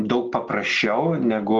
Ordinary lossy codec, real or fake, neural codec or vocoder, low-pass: Opus, 16 kbps; real; none; 7.2 kHz